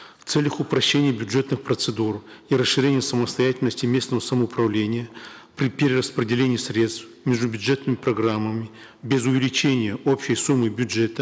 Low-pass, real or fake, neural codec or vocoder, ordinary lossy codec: none; real; none; none